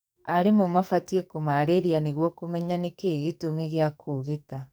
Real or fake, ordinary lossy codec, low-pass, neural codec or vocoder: fake; none; none; codec, 44.1 kHz, 2.6 kbps, SNAC